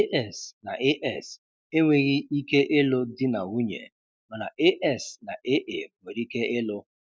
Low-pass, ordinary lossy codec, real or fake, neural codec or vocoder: none; none; real; none